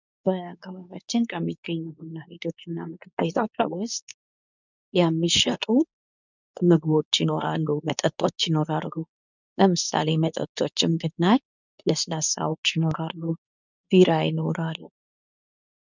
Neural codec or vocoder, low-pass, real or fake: codec, 24 kHz, 0.9 kbps, WavTokenizer, medium speech release version 2; 7.2 kHz; fake